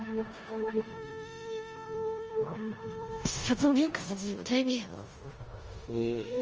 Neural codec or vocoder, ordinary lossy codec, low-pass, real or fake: codec, 16 kHz in and 24 kHz out, 0.4 kbps, LongCat-Audio-Codec, four codebook decoder; Opus, 24 kbps; 7.2 kHz; fake